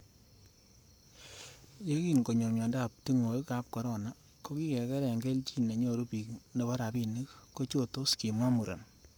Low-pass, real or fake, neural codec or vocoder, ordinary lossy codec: none; fake; codec, 44.1 kHz, 7.8 kbps, Pupu-Codec; none